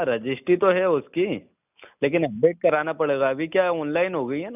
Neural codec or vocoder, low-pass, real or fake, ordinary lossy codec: none; 3.6 kHz; real; none